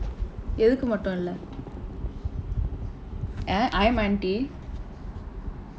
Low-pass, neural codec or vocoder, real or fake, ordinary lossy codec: none; none; real; none